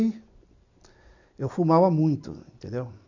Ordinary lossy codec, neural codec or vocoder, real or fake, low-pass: none; none; real; 7.2 kHz